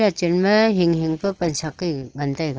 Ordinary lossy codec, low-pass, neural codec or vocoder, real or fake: Opus, 16 kbps; 7.2 kHz; none; real